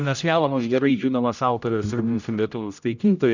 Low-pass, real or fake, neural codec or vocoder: 7.2 kHz; fake; codec, 16 kHz, 0.5 kbps, X-Codec, HuBERT features, trained on general audio